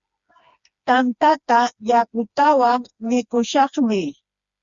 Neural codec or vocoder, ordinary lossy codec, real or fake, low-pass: codec, 16 kHz, 2 kbps, FreqCodec, smaller model; Opus, 64 kbps; fake; 7.2 kHz